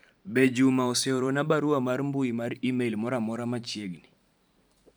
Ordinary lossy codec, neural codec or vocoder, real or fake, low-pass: none; vocoder, 44.1 kHz, 128 mel bands every 512 samples, BigVGAN v2; fake; none